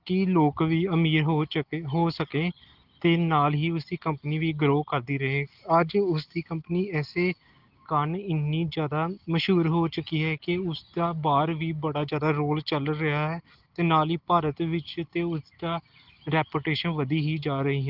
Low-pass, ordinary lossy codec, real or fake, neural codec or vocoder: 5.4 kHz; Opus, 16 kbps; real; none